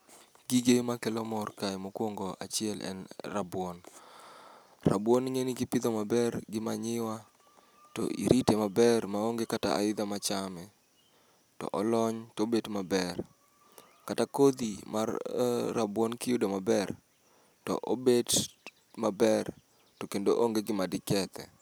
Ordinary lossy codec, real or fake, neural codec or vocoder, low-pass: none; real; none; none